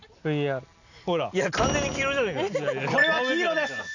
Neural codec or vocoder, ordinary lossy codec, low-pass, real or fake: none; none; 7.2 kHz; real